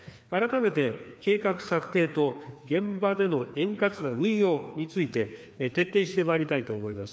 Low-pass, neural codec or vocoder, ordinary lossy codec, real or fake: none; codec, 16 kHz, 2 kbps, FreqCodec, larger model; none; fake